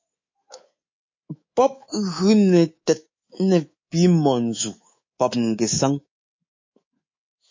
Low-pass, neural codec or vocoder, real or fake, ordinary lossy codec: 7.2 kHz; codec, 24 kHz, 3.1 kbps, DualCodec; fake; MP3, 32 kbps